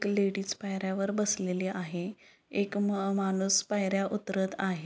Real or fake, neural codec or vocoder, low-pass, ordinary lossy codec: real; none; none; none